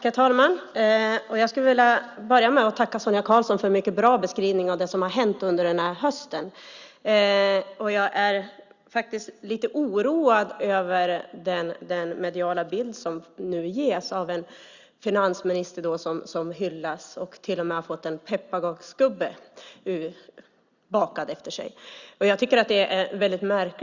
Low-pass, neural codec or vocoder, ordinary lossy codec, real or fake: 7.2 kHz; none; Opus, 64 kbps; real